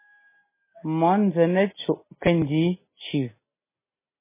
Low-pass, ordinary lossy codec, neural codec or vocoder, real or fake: 3.6 kHz; MP3, 16 kbps; none; real